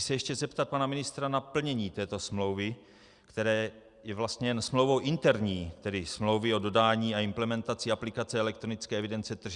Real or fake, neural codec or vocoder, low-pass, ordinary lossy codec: real; none; 10.8 kHz; Opus, 64 kbps